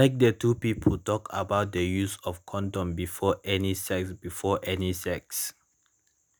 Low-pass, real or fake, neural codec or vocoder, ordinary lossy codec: none; real; none; none